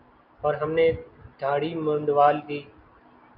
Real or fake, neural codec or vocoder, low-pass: real; none; 5.4 kHz